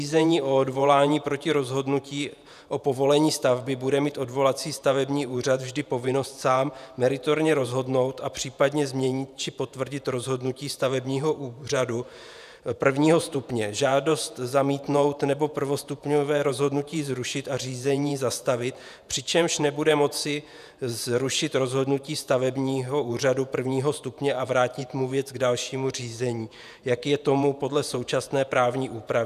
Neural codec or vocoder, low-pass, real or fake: vocoder, 48 kHz, 128 mel bands, Vocos; 14.4 kHz; fake